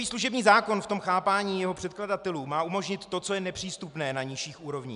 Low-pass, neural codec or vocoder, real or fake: 10.8 kHz; none; real